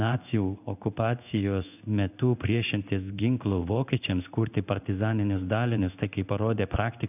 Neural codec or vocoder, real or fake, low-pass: codec, 16 kHz in and 24 kHz out, 1 kbps, XY-Tokenizer; fake; 3.6 kHz